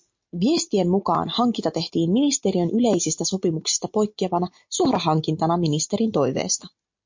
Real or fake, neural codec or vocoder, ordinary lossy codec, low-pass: real; none; MP3, 48 kbps; 7.2 kHz